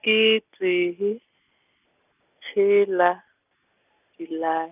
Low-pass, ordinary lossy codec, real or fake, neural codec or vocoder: 3.6 kHz; none; real; none